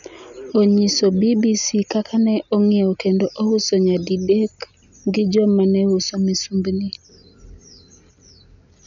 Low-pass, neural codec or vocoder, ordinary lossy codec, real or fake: 7.2 kHz; none; none; real